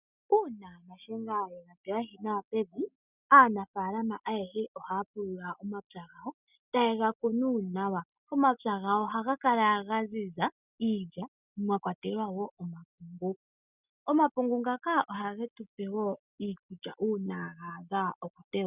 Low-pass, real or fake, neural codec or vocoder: 3.6 kHz; real; none